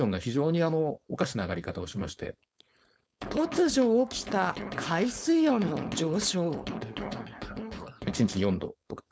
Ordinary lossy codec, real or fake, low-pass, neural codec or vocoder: none; fake; none; codec, 16 kHz, 4.8 kbps, FACodec